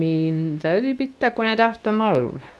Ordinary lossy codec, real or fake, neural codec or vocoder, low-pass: none; fake; codec, 24 kHz, 0.9 kbps, WavTokenizer, medium speech release version 2; none